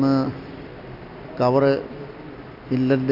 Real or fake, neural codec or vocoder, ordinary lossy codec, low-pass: real; none; none; 5.4 kHz